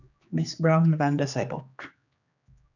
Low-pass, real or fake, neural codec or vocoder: 7.2 kHz; fake; codec, 16 kHz, 2 kbps, X-Codec, HuBERT features, trained on general audio